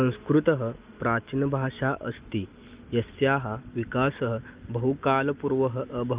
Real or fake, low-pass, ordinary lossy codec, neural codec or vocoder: real; 3.6 kHz; Opus, 32 kbps; none